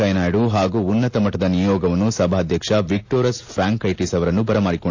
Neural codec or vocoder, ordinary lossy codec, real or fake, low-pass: none; AAC, 48 kbps; real; 7.2 kHz